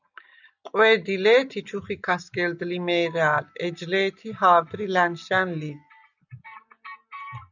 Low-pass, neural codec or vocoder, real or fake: 7.2 kHz; none; real